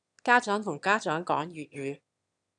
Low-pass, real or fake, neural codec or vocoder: 9.9 kHz; fake; autoencoder, 22.05 kHz, a latent of 192 numbers a frame, VITS, trained on one speaker